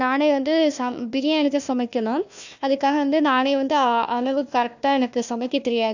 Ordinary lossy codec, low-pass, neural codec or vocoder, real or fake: none; 7.2 kHz; codec, 16 kHz, 1 kbps, FunCodec, trained on Chinese and English, 50 frames a second; fake